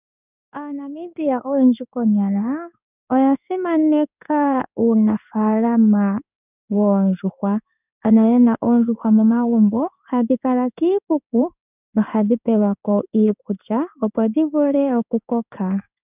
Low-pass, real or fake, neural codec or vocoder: 3.6 kHz; fake; codec, 16 kHz in and 24 kHz out, 1 kbps, XY-Tokenizer